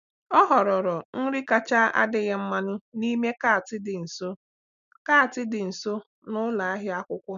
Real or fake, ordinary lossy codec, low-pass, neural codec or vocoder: real; none; 7.2 kHz; none